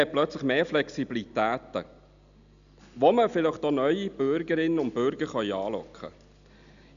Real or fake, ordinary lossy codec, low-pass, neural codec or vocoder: real; none; 7.2 kHz; none